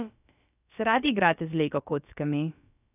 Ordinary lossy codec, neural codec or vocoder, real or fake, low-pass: none; codec, 16 kHz, about 1 kbps, DyCAST, with the encoder's durations; fake; 3.6 kHz